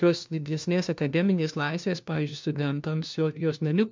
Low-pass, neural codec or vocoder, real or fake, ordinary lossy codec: 7.2 kHz; codec, 16 kHz, 1 kbps, FunCodec, trained on LibriTTS, 50 frames a second; fake; MP3, 64 kbps